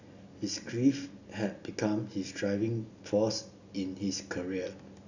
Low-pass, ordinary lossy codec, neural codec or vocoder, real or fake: 7.2 kHz; none; none; real